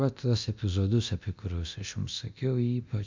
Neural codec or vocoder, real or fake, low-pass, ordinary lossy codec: codec, 24 kHz, 0.9 kbps, DualCodec; fake; 7.2 kHz; MP3, 64 kbps